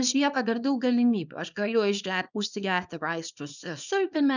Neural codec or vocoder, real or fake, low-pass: codec, 24 kHz, 0.9 kbps, WavTokenizer, small release; fake; 7.2 kHz